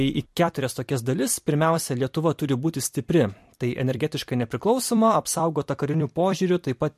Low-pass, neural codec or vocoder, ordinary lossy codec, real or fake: 14.4 kHz; vocoder, 44.1 kHz, 128 mel bands every 256 samples, BigVGAN v2; MP3, 64 kbps; fake